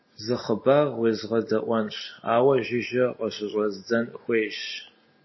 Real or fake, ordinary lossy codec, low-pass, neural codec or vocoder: real; MP3, 24 kbps; 7.2 kHz; none